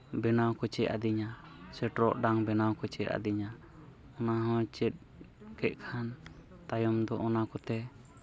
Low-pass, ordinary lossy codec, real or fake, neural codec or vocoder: none; none; real; none